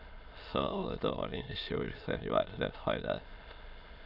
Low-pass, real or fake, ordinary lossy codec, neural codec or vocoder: 5.4 kHz; fake; none; autoencoder, 22.05 kHz, a latent of 192 numbers a frame, VITS, trained on many speakers